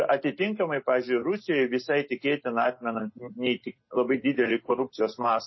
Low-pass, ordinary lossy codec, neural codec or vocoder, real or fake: 7.2 kHz; MP3, 24 kbps; none; real